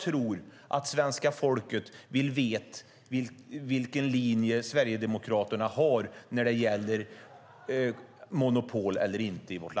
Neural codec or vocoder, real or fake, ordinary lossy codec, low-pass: none; real; none; none